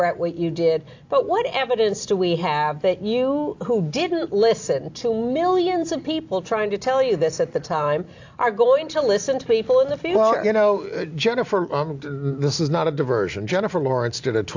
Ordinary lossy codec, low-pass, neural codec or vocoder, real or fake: AAC, 48 kbps; 7.2 kHz; none; real